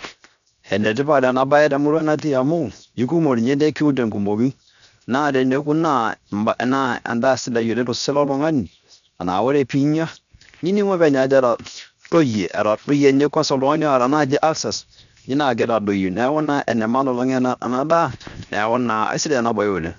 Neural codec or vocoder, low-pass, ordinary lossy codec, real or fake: codec, 16 kHz, 0.7 kbps, FocalCodec; 7.2 kHz; none; fake